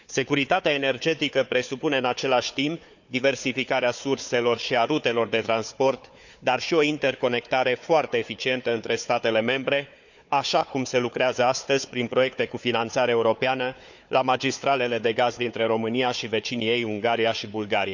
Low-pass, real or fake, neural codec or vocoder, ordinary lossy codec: 7.2 kHz; fake; codec, 16 kHz, 4 kbps, FunCodec, trained on Chinese and English, 50 frames a second; none